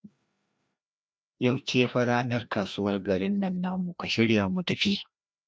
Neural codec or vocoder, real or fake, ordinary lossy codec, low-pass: codec, 16 kHz, 1 kbps, FreqCodec, larger model; fake; none; none